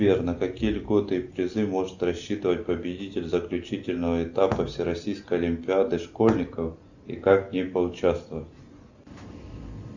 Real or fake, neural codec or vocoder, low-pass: real; none; 7.2 kHz